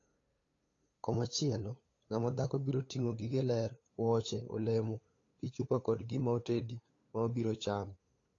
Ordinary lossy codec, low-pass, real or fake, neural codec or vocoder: AAC, 32 kbps; 7.2 kHz; fake; codec, 16 kHz, 8 kbps, FunCodec, trained on LibriTTS, 25 frames a second